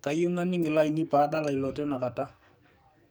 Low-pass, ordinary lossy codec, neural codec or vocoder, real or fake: none; none; codec, 44.1 kHz, 2.6 kbps, SNAC; fake